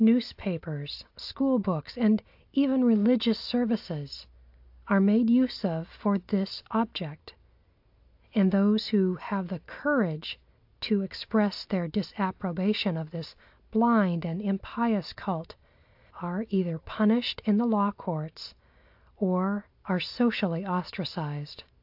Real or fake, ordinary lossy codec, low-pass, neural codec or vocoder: real; AAC, 48 kbps; 5.4 kHz; none